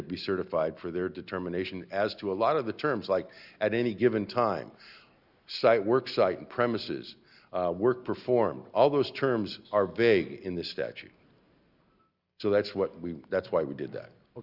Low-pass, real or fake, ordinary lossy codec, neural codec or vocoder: 5.4 kHz; real; Opus, 64 kbps; none